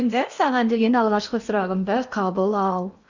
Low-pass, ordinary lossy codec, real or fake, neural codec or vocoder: 7.2 kHz; none; fake; codec, 16 kHz in and 24 kHz out, 0.8 kbps, FocalCodec, streaming, 65536 codes